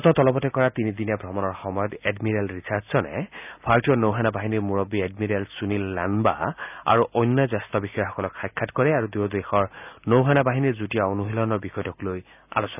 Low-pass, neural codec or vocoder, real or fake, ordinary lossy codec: 3.6 kHz; none; real; none